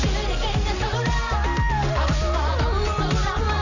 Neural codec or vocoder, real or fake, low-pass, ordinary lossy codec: none; real; 7.2 kHz; none